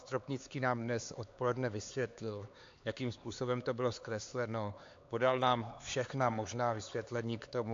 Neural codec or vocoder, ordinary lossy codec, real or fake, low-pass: codec, 16 kHz, 4 kbps, X-Codec, HuBERT features, trained on LibriSpeech; AAC, 48 kbps; fake; 7.2 kHz